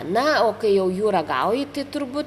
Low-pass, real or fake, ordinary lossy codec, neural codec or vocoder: 14.4 kHz; real; Opus, 64 kbps; none